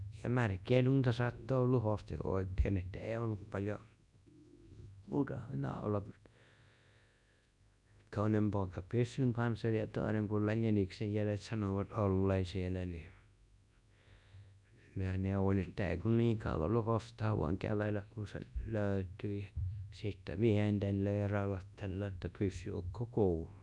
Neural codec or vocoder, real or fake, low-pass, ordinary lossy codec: codec, 24 kHz, 0.9 kbps, WavTokenizer, large speech release; fake; 10.8 kHz; none